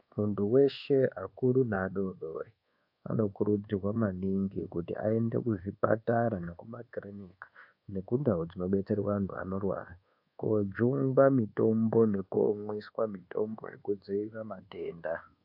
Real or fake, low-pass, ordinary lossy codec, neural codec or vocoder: fake; 5.4 kHz; AAC, 48 kbps; codec, 24 kHz, 1.2 kbps, DualCodec